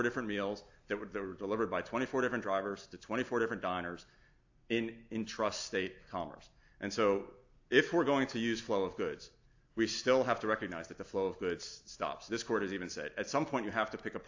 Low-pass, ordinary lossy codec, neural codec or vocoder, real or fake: 7.2 kHz; AAC, 48 kbps; none; real